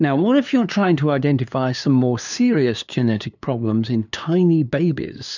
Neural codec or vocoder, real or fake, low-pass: codec, 16 kHz, 2 kbps, FunCodec, trained on LibriTTS, 25 frames a second; fake; 7.2 kHz